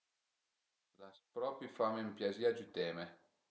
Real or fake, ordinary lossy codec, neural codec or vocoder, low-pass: real; none; none; none